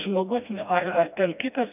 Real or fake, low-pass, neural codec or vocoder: fake; 3.6 kHz; codec, 16 kHz, 1 kbps, FreqCodec, smaller model